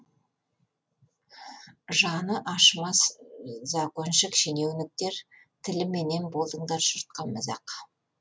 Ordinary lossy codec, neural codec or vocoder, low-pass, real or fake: none; none; none; real